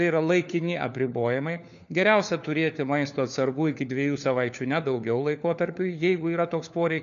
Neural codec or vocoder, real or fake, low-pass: codec, 16 kHz, 4 kbps, FunCodec, trained on LibriTTS, 50 frames a second; fake; 7.2 kHz